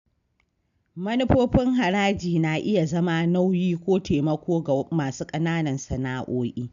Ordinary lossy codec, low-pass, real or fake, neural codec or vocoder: none; 7.2 kHz; real; none